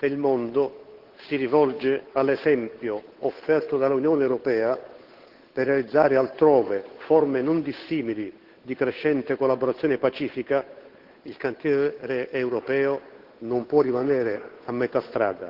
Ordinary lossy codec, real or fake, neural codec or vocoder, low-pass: Opus, 16 kbps; fake; codec, 16 kHz, 8 kbps, FunCodec, trained on Chinese and English, 25 frames a second; 5.4 kHz